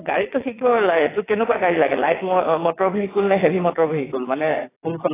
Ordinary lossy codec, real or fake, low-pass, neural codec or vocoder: AAC, 16 kbps; fake; 3.6 kHz; vocoder, 22.05 kHz, 80 mel bands, WaveNeXt